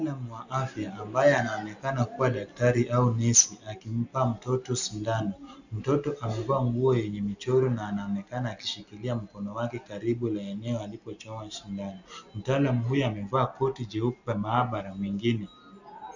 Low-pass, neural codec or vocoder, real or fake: 7.2 kHz; none; real